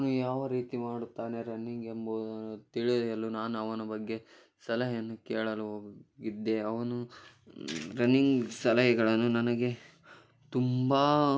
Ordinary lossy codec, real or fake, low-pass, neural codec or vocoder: none; real; none; none